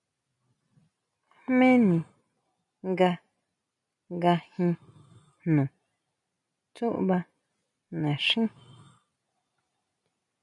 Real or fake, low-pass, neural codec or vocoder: real; 10.8 kHz; none